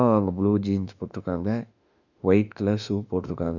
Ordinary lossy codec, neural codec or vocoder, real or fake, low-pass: none; autoencoder, 48 kHz, 32 numbers a frame, DAC-VAE, trained on Japanese speech; fake; 7.2 kHz